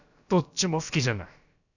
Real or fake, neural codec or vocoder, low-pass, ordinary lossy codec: fake; codec, 16 kHz, about 1 kbps, DyCAST, with the encoder's durations; 7.2 kHz; none